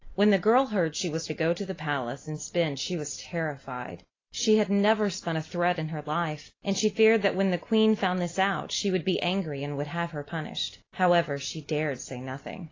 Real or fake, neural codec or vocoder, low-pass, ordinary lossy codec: real; none; 7.2 kHz; AAC, 32 kbps